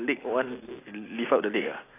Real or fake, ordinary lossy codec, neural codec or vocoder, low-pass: real; AAC, 16 kbps; none; 3.6 kHz